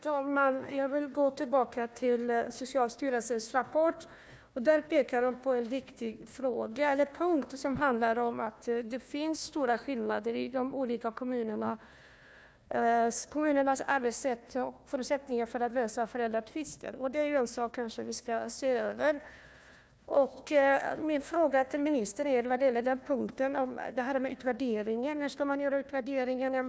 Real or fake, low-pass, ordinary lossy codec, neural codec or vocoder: fake; none; none; codec, 16 kHz, 1 kbps, FunCodec, trained on Chinese and English, 50 frames a second